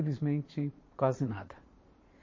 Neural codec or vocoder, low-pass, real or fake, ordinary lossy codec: vocoder, 22.05 kHz, 80 mel bands, Vocos; 7.2 kHz; fake; MP3, 32 kbps